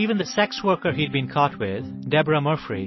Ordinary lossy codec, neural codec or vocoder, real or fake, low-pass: MP3, 24 kbps; none; real; 7.2 kHz